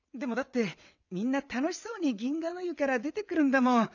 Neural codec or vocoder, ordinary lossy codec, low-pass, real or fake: vocoder, 22.05 kHz, 80 mel bands, WaveNeXt; none; 7.2 kHz; fake